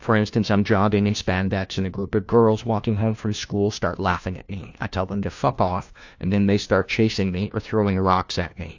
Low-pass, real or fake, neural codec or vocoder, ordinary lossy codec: 7.2 kHz; fake; codec, 16 kHz, 1 kbps, FunCodec, trained on LibriTTS, 50 frames a second; AAC, 48 kbps